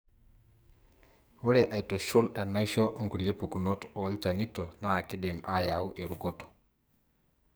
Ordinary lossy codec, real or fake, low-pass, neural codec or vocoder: none; fake; none; codec, 44.1 kHz, 2.6 kbps, SNAC